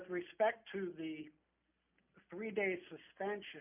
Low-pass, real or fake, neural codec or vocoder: 3.6 kHz; real; none